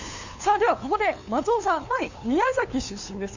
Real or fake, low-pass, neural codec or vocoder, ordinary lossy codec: fake; 7.2 kHz; codec, 16 kHz, 2 kbps, FunCodec, trained on LibriTTS, 25 frames a second; Opus, 64 kbps